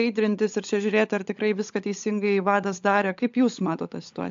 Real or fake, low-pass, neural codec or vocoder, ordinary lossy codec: fake; 7.2 kHz; codec, 16 kHz, 16 kbps, FunCodec, trained on LibriTTS, 50 frames a second; AAC, 64 kbps